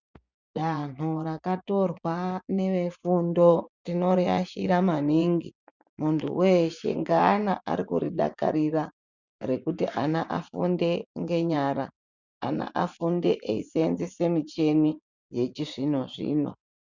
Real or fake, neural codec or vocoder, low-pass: fake; vocoder, 24 kHz, 100 mel bands, Vocos; 7.2 kHz